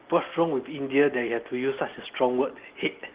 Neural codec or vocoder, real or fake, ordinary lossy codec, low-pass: none; real; Opus, 16 kbps; 3.6 kHz